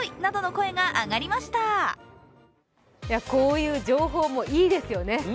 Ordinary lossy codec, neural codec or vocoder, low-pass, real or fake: none; none; none; real